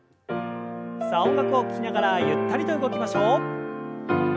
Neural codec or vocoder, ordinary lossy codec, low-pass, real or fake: none; none; none; real